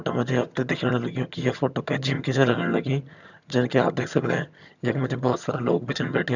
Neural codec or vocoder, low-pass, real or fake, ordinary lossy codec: vocoder, 22.05 kHz, 80 mel bands, HiFi-GAN; 7.2 kHz; fake; none